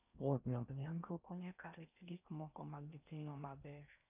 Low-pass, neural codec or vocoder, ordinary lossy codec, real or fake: 3.6 kHz; codec, 16 kHz in and 24 kHz out, 0.6 kbps, FocalCodec, streaming, 4096 codes; none; fake